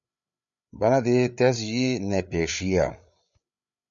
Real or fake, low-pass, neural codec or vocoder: fake; 7.2 kHz; codec, 16 kHz, 8 kbps, FreqCodec, larger model